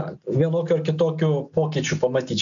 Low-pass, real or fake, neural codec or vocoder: 7.2 kHz; real; none